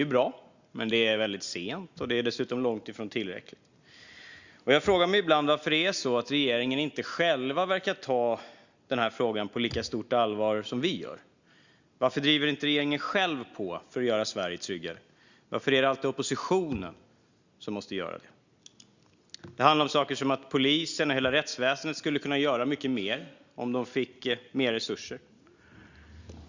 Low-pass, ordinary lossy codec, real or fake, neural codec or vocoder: 7.2 kHz; Opus, 64 kbps; real; none